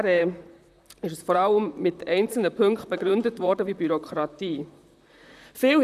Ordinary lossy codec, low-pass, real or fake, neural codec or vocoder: none; 14.4 kHz; fake; vocoder, 44.1 kHz, 128 mel bands, Pupu-Vocoder